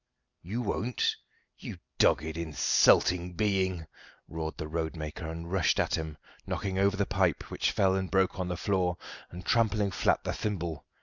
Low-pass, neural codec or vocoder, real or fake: 7.2 kHz; none; real